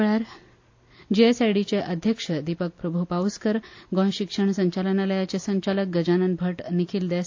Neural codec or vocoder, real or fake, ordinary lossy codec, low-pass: none; real; AAC, 48 kbps; 7.2 kHz